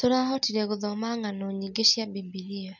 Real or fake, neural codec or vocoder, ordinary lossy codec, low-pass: real; none; none; 7.2 kHz